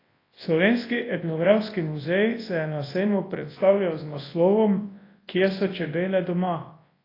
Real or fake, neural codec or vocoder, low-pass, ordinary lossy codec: fake; codec, 24 kHz, 0.9 kbps, WavTokenizer, large speech release; 5.4 kHz; AAC, 24 kbps